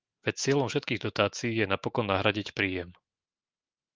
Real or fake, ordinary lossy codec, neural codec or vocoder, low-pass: fake; Opus, 32 kbps; autoencoder, 48 kHz, 128 numbers a frame, DAC-VAE, trained on Japanese speech; 7.2 kHz